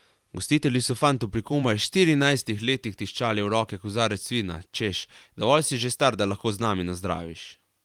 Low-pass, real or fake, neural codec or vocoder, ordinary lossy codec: 19.8 kHz; fake; vocoder, 44.1 kHz, 128 mel bands every 512 samples, BigVGAN v2; Opus, 32 kbps